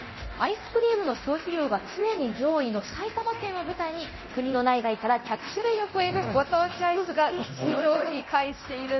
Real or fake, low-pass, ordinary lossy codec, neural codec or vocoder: fake; 7.2 kHz; MP3, 24 kbps; codec, 24 kHz, 0.9 kbps, DualCodec